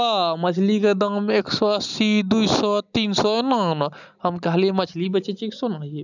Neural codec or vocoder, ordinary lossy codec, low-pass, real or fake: none; none; 7.2 kHz; real